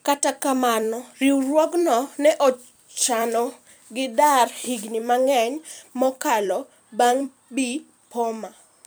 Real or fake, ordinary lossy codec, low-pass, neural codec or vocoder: fake; none; none; vocoder, 44.1 kHz, 128 mel bands every 256 samples, BigVGAN v2